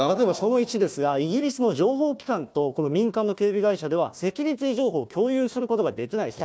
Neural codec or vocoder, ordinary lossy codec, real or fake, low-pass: codec, 16 kHz, 1 kbps, FunCodec, trained on Chinese and English, 50 frames a second; none; fake; none